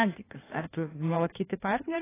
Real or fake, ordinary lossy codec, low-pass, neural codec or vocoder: fake; AAC, 16 kbps; 3.6 kHz; codec, 16 kHz in and 24 kHz out, 1.1 kbps, FireRedTTS-2 codec